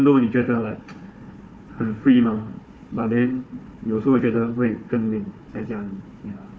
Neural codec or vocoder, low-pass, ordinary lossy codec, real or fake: codec, 16 kHz, 4 kbps, FunCodec, trained on Chinese and English, 50 frames a second; none; none; fake